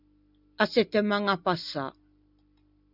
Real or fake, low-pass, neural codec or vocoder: real; 5.4 kHz; none